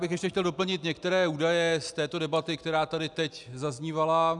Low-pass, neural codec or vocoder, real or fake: 10.8 kHz; none; real